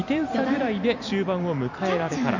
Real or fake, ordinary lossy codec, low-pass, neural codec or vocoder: fake; none; 7.2 kHz; vocoder, 44.1 kHz, 128 mel bands every 256 samples, BigVGAN v2